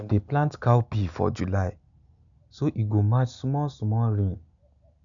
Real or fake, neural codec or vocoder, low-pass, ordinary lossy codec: real; none; 7.2 kHz; none